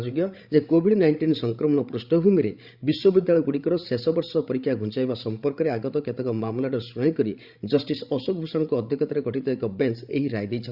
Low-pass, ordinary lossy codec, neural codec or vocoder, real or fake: 5.4 kHz; Opus, 64 kbps; codec, 16 kHz, 16 kbps, FreqCodec, larger model; fake